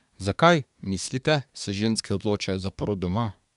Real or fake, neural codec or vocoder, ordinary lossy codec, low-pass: fake; codec, 24 kHz, 1 kbps, SNAC; none; 10.8 kHz